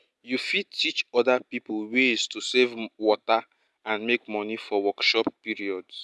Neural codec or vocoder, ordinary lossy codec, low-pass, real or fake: none; none; none; real